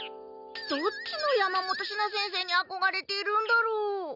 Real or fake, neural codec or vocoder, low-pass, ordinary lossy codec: real; none; 5.4 kHz; none